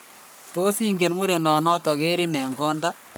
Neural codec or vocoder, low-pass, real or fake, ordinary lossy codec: codec, 44.1 kHz, 3.4 kbps, Pupu-Codec; none; fake; none